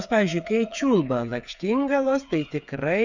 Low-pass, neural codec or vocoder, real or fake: 7.2 kHz; codec, 16 kHz, 8 kbps, FreqCodec, smaller model; fake